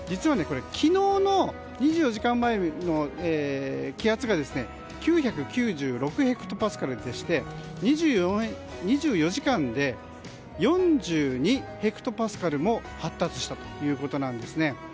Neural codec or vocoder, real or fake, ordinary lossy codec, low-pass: none; real; none; none